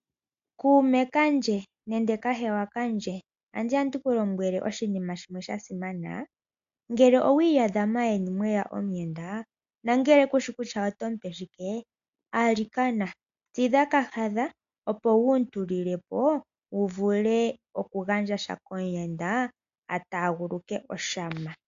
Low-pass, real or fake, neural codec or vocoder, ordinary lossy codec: 7.2 kHz; real; none; AAC, 64 kbps